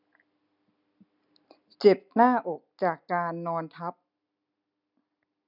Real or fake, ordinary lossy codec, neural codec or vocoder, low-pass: real; none; none; 5.4 kHz